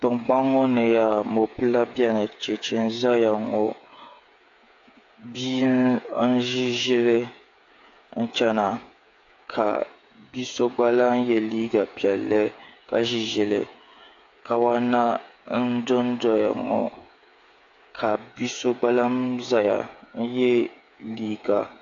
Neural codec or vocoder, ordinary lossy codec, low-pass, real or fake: codec, 16 kHz, 8 kbps, FreqCodec, smaller model; AAC, 48 kbps; 7.2 kHz; fake